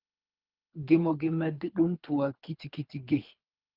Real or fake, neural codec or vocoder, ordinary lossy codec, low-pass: fake; codec, 24 kHz, 3 kbps, HILCodec; Opus, 24 kbps; 5.4 kHz